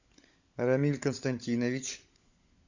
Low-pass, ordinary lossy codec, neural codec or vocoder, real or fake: 7.2 kHz; Opus, 64 kbps; codec, 16 kHz, 16 kbps, FunCodec, trained on LibriTTS, 50 frames a second; fake